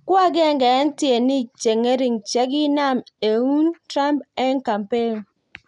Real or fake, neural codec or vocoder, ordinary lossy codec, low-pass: real; none; none; 10.8 kHz